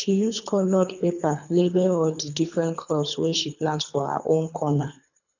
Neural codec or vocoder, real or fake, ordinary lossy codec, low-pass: codec, 24 kHz, 3 kbps, HILCodec; fake; none; 7.2 kHz